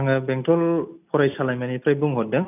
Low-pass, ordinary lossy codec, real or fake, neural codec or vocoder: 3.6 kHz; none; real; none